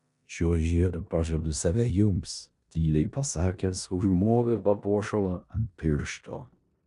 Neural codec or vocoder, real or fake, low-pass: codec, 16 kHz in and 24 kHz out, 0.9 kbps, LongCat-Audio-Codec, four codebook decoder; fake; 10.8 kHz